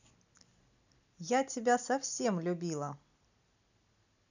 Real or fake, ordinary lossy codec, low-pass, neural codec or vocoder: real; none; 7.2 kHz; none